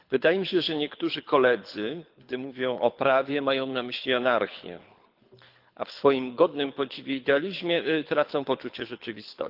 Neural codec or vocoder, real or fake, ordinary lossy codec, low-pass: codec, 24 kHz, 6 kbps, HILCodec; fake; Opus, 32 kbps; 5.4 kHz